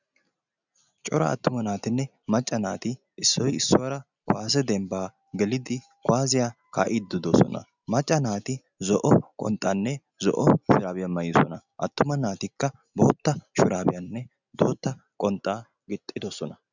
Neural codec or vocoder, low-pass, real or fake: none; 7.2 kHz; real